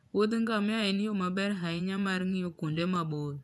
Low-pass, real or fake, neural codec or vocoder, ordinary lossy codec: none; fake; vocoder, 24 kHz, 100 mel bands, Vocos; none